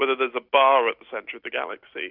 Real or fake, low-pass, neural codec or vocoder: real; 5.4 kHz; none